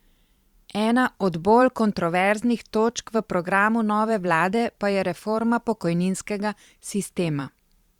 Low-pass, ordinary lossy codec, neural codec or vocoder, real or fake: 19.8 kHz; Opus, 64 kbps; vocoder, 44.1 kHz, 128 mel bands every 512 samples, BigVGAN v2; fake